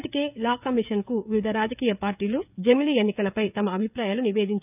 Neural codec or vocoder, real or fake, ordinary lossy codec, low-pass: codec, 16 kHz, 16 kbps, FreqCodec, smaller model; fake; none; 3.6 kHz